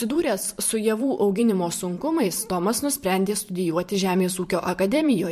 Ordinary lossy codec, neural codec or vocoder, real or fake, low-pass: MP3, 64 kbps; vocoder, 44.1 kHz, 128 mel bands every 512 samples, BigVGAN v2; fake; 14.4 kHz